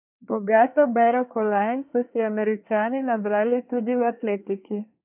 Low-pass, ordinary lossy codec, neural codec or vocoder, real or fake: 3.6 kHz; none; codec, 24 kHz, 1 kbps, SNAC; fake